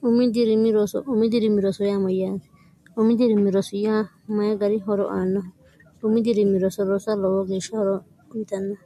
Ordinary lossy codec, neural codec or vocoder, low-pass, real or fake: MP3, 64 kbps; none; 14.4 kHz; real